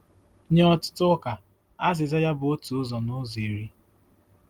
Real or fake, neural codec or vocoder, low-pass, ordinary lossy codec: real; none; 19.8 kHz; Opus, 24 kbps